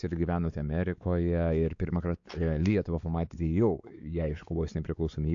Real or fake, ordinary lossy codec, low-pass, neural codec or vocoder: fake; AAC, 64 kbps; 7.2 kHz; codec, 16 kHz, 4 kbps, X-Codec, WavLM features, trained on Multilingual LibriSpeech